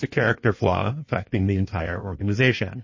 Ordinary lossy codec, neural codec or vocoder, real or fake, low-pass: MP3, 32 kbps; codec, 16 kHz in and 24 kHz out, 1.1 kbps, FireRedTTS-2 codec; fake; 7.2 kHz